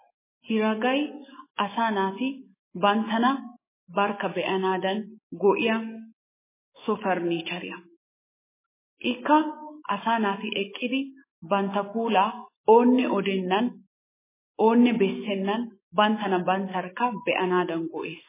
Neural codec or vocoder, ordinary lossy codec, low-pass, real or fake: autoencoder, 48 kHz, 128 numbers a frame, DAC-VAE, trained on Japanese speech; MP3, 16 kbps; 3.6 kHz; fake